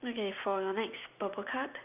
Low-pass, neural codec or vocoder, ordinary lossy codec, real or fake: 3.6 kHz; none; none; real